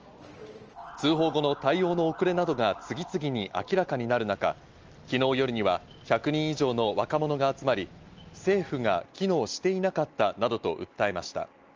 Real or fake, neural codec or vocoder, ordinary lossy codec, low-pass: real; none; Opus, 24 kbps; 7.2 kHz